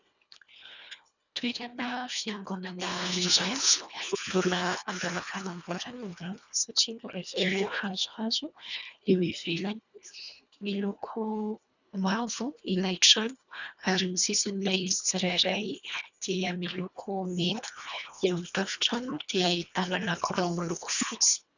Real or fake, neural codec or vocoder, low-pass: fake; codec, 24 kHz, 1.5 kbps, HILCodec; 7.2 kHz